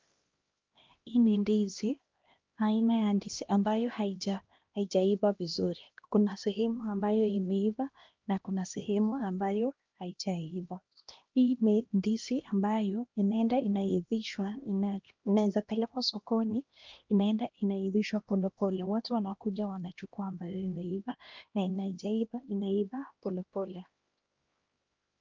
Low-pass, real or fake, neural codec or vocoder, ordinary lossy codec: 7.2 kHz; fake; codec, 16 kHz, 1 kbps, X-Codec, HuBERT features, trained on LibriSpeech; Opus, 32 kbps